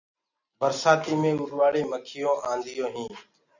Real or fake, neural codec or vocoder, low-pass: real; none; 7.2 kHz